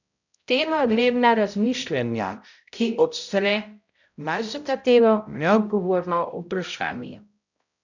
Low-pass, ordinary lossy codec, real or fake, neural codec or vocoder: 7.2 kHz; none; fake; codec, 16 kHz, 0.5 kbps, X-Codec, HuBERT features, trained on balanced general audio